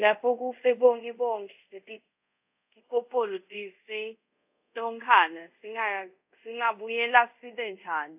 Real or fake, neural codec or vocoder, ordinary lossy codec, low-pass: fake; codec, 24 kHz, 0.5 kbps, DualCodec; AAC, 32 kbps; 3.6 kHz